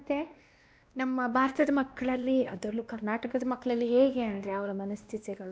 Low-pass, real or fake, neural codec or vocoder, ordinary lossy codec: none; fake; codec, 16 kHz, 1 kbps, X-Codec, WavLM features, trained on Multilingual LibriSpeech; none